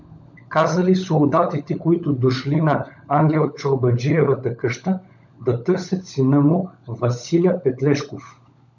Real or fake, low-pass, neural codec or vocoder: fake; 7.2 kHz; codec, 16 kHz, 16 kbps, FunCodec, trained on LibriTTS, 50 frames a second